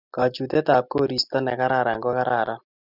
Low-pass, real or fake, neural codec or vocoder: 5.4 kHz; real; none